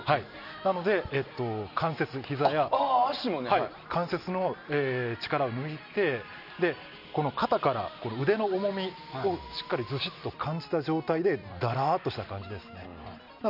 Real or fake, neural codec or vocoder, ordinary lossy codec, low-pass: real; none; none; 5.4 kHz